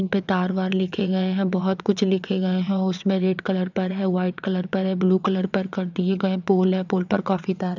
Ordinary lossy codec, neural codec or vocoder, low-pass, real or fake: none; vocoder, 22.05 kHz, 80 mel bands, WaveNeXt; 7.2 kHz; fake